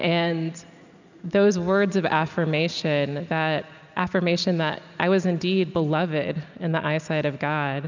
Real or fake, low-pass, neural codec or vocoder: real; 7.2 kHz; none